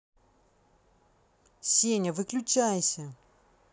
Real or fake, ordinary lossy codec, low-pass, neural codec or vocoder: real; none; none; none